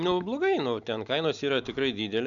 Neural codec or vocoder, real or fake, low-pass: none; real; 7.2 kHz